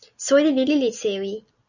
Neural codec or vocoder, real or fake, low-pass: none; real; 7.2 kHz